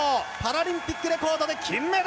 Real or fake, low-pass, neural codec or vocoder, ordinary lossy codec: real; none; none; none